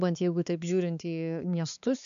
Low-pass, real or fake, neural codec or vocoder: 7.2 kHz; fake; codec, 16 kHz, 4 kbps, X-Codec, HuBERT features, trained on balanced general audio